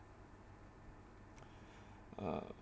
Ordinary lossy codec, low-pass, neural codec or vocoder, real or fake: none; none; none; real